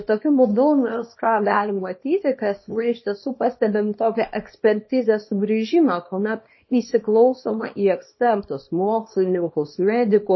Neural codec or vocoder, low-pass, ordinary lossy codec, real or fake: codec, 24 kHz, 0.9 kbps, WavTokenizer, small release; 7.2 kHz; MP3, 24 kbps; fake